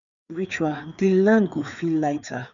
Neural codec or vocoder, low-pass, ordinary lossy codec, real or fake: codec, 16 kHz, 4 kbps, FreqCodec, larger model; 7.2 kHz; none; fake